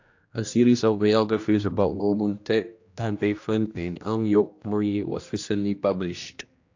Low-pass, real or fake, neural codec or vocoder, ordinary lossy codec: 7.2 kHz; fake; codec, 16 kHz, 1 kbps, X-Codec, HuBERT features, trained on general audio; AAC, 48 kbps